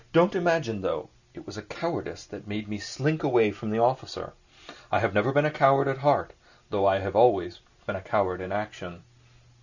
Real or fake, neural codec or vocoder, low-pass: real; none; 7.2 kHz